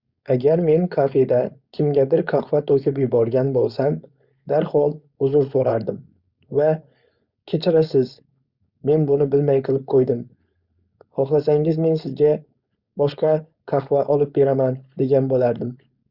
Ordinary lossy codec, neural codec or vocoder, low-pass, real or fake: Opus, 64 kbps; codec, 16 kHz, 4.8 kbps, FACodec; 5.4 kHz; fake